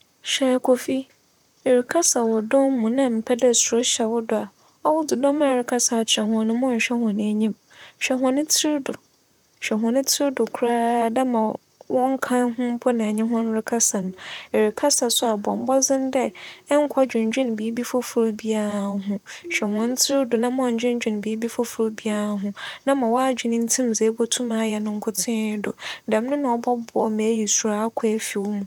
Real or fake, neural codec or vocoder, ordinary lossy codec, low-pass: fake; vocoder, 44.1 kHz, 128 mel bands, Pupu-Vocoder; none; 19.8 kHz